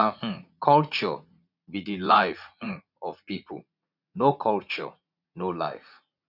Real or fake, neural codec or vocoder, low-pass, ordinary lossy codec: fake; vocoder, 44.1 kHz, 80 mel bands, Vocos; 5.4 kHz; none